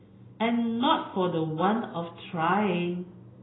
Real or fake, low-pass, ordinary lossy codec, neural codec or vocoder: real; 7.2 kHz; AAC, 16 kbps; none